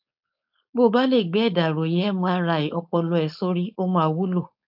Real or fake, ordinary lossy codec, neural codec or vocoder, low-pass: fake; AAC, 48 kbps; codec, 16 kHz, 4.8 kbps, FACodec; 5.4 kHz